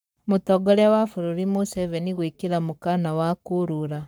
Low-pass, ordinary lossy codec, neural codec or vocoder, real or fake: none; none; codec, 44.1 kHz, 7.8 kbps, Pupu-Codec; fake